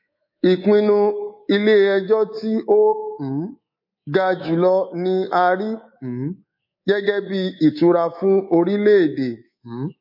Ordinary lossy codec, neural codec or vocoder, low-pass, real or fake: MP3, 32 kbps; codec, 24 kHz, 3.1 kbps, DualCodec; 5.4 kHz; fake